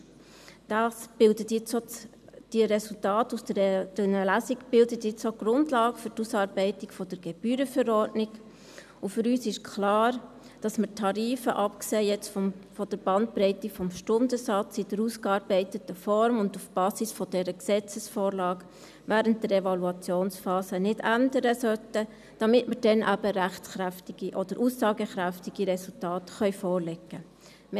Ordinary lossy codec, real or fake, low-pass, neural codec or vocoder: none; real; 14.4 kHz; none